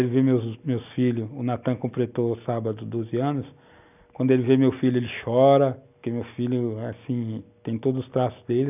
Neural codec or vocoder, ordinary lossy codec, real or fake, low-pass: none; none; real; 3.6 kHz